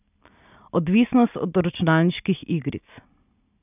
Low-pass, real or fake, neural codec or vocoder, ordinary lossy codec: 3.6 kHz; real; none; none